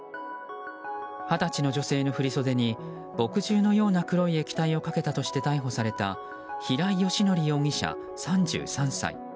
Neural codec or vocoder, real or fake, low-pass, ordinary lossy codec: none; real; none; none